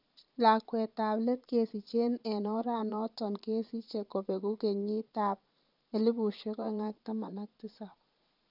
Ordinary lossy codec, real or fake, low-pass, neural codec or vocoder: none; fake; 5.4 kHz; vocoder, 22.05 kHz, 80 mel bands, Vocos